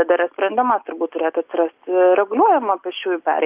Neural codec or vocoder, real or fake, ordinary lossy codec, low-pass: none; real; Opus, 32 kbps; 3.6 kHz